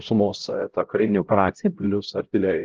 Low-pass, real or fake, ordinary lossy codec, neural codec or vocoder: 7.2 kHz; fake; Opus, 16 kbps; codec, 16 kHz, 0.5 kbps, X-Codec, HuBERT features, trained on LibriSpeech